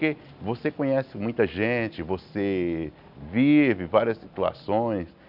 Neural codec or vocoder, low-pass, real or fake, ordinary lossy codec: none; 5.4 kHz; real; none